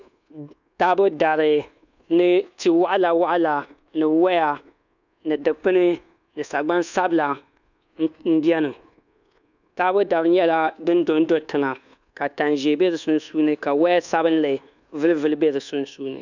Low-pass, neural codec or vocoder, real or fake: 7.2 kHz; codec, 24 kHz, 1.2 kbps, DualCodec; fake